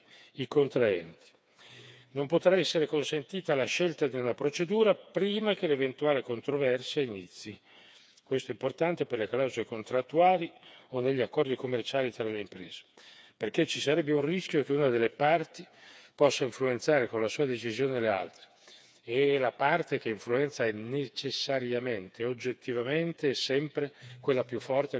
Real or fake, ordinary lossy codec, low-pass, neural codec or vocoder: fake; none; none; codec, 16 kHz, 4 kbps, FreqCodec, smaller model